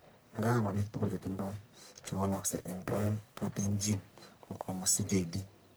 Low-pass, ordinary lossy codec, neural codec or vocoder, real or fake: none; none; codec, 44.1 kHz, 1.7 kbps, Pupu-Codec; fake